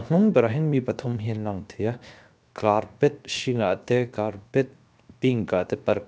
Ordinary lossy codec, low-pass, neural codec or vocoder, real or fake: none; none; codec, 16 kHz, 0.7 kbps, FocalCodec; fake